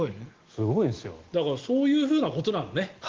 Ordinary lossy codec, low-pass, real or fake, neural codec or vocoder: Opus, 16 kbps; 7.2 kHz; real; none